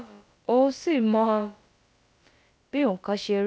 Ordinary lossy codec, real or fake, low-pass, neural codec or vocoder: none; fake; none; codec, 16 kHz, about 1 kbps, DyCAST, with the encoder's durations